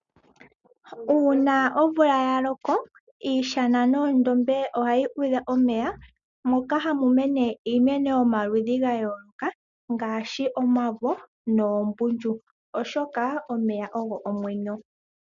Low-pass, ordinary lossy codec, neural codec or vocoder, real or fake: 7.2 kHz; MP3, 96 kbps; none; real